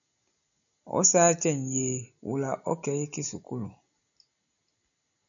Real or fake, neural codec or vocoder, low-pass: real; none; 7.2 kHz